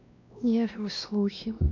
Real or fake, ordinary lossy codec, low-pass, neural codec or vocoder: fake; none; 7.2 kHz; codec, 16 kHz, 1 kbps, X-Codec, WavLM features, trained on Multilingual LibriSpeech